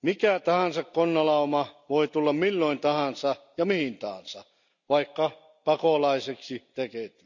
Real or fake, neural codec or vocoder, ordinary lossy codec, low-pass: real; none; none; 7.2 kHz